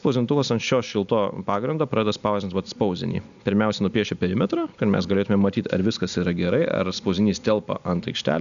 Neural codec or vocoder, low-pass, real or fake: none; 7.2 kHz; real